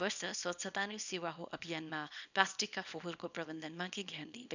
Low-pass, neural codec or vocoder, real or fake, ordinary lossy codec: 7.2 kHz; codec, 24 kHz, 0.9 kbps, WavTokenizer, small release; fake; none